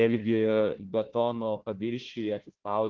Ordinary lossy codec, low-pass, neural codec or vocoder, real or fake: Opus, 16 kbps; 7.2 kHz; codec, 16 kHz, 1 kbps, FunCodec, trained on Chinese and English, 50 frames a second; fake